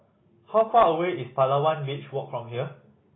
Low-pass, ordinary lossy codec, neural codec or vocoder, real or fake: 7.2 kHz; AAC, 16 kbps; none; real